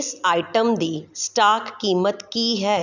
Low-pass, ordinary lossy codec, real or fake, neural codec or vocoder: 7.2 kHz; none; real; none